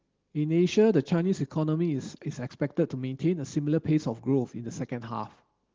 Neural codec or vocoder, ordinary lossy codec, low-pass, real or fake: none; Opus, 16 kbps; 7.2 kHz; real